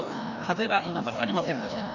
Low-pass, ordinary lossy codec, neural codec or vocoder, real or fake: 7.2 kHz; none; codec, 16 kHz, 1 kbps, FreqCodec, larger model; fake